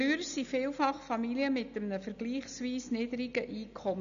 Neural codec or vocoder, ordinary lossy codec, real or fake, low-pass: none; none; real; 7.2 kHz